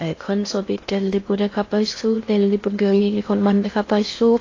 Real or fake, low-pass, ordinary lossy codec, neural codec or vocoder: fake; 7.2 kHz; AAC, 32 kbps; codec, 16 kHz in and 24 kHz out, 0.6 kbps, FocalCodec, streaming, 2048 codes